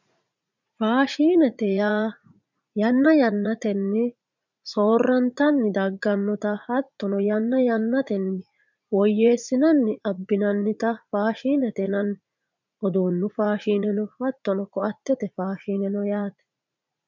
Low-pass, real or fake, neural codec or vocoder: 7.2 kHz; fake; vocoder, 44.1 kHz, 80 mel bands, Vocos